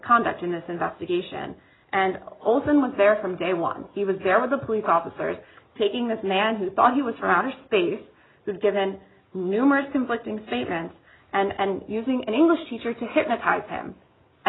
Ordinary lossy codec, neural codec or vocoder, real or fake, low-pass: AAC, 16 kbps; none; real; 7.2 kHz